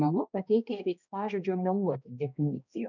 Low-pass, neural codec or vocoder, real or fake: 7.2 kHz; codec, 16 kHz, 1 kbps, X-Codec, HuBERT features, trained on balanced general audio; fake